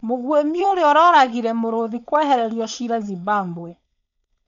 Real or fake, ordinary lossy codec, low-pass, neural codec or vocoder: fake; none; 7.2 kHz; codec, 16 kHz, 4.8 kbps, FACodec